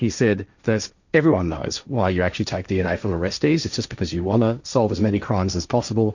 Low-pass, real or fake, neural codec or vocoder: 7.2 kHz; fake; codec, 16 kHz, 1.1 kbps, Voila-Tokenizer